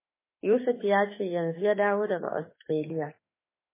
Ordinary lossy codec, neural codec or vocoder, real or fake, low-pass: MP3, 16 kbps; autoencoder, 48 kHz, 32 numbers a frame, DAC-VAE, trained on Japanese speech; fake; 3.6 kHz